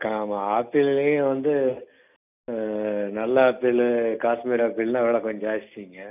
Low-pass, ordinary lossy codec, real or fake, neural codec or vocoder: 3.6 kHz; none; real; none